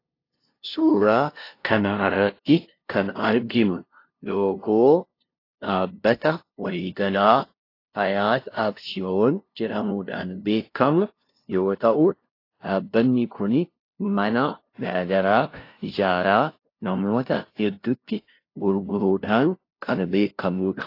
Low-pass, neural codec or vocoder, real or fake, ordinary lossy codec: 5.4 kHz; codec, 16 kHz, 0.5 kbps, FunCodec, trained on LibriTTS, 25 frames a second; fake; AAC, 32 kbps